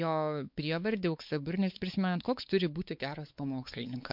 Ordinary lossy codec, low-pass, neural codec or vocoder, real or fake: MP3, 48 kbps; 5.4 kHz; codec, 16 kHz, 4 kbps, X-Codec, WavLM features, trained on Multilingual LibriSpeech; fake